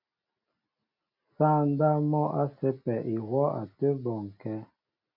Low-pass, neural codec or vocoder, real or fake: 5.4 kHz; none; real